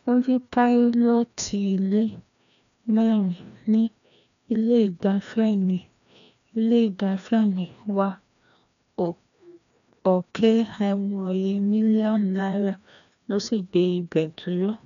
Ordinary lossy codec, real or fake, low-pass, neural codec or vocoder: none; fake; 7.2 kHz; codec, 16 kHz, 1 kbps, FreqCodec, larger model